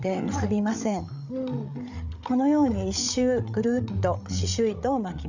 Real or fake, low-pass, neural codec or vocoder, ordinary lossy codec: fake; 7.2 kHz; codec, 16 kHz, 8 kbps, FreqCodec, larger model; none